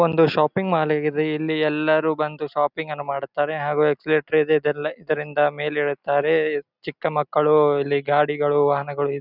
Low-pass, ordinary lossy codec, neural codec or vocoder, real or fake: 5.4 kHz; none; none; real